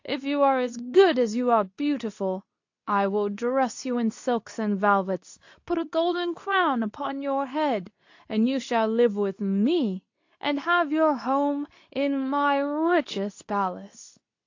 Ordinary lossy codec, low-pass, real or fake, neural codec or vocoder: AAC, 48 kbps; 7.2 kHz; fake; codec, 24 kHz, 0.9 kbps, WavTokenizer, medium speech release version 2